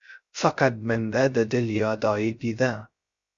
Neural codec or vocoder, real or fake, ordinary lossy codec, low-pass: codec, 16 kHz, 0.2 kbps, FocalCodec; fake; Opus, 64 kbps; 7.2 kHz